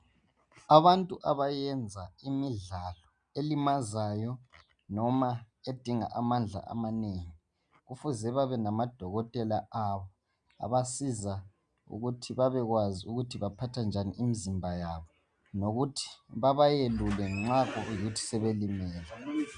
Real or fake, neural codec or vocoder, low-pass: real; none; 9.9 kHz